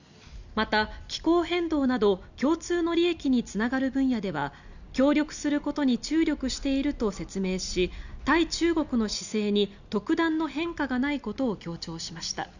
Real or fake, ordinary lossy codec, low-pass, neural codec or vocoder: real; none; 7.2 kHz; none